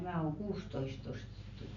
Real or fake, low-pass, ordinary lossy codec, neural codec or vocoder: real; 7.2 kHz; none; none